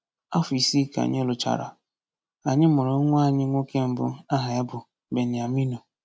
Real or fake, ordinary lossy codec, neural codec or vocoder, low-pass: real; none; none; none